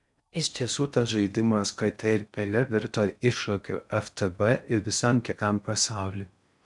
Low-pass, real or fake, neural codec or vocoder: 10.8 kHz; fake; codec, 16 kHz in and 24 kHz out, 0.6 kbps, FocalCodec, streaming, 4096 codes